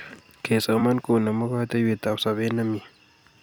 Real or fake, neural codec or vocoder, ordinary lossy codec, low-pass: fake; vocoder, 44.1 kHz, 128 mel bands every 256 samples, BigVGAN v2; none; 19.8 kHz